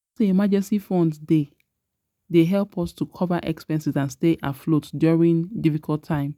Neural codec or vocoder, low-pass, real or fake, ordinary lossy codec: none; 19.8 kHz; real; none